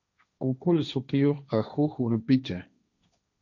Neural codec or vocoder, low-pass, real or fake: codec, 16 kHz, 1.1 kbps, Voila-Tokenizer; 7.2 kHz; fake